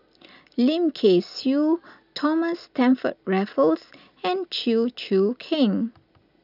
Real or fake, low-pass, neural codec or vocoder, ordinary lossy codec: real; 5.4 kHz; none; none